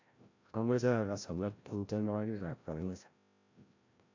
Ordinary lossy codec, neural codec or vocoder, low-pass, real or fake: AAC, 48 kbps; codec, 16 kHz, 0.5 kbps, FreqCodec, larger model; 7.2 kHz; fake